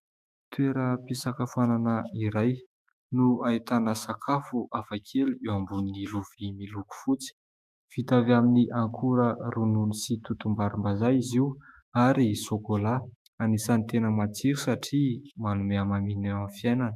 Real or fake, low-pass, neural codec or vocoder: fake; 14.4 kHz; autoencoder, 48 kHz, 128 numbers a frame, DAC-VAE, trained on Japanese speech